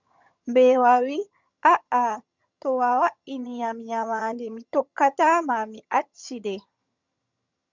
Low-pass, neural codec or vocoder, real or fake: 7.2 kHz; vocoder, 22.05 kHz, 80 mel bands, HiFi-GAN; fake